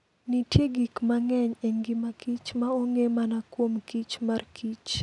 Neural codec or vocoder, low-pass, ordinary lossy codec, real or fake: none; 10.8 kHz; none; real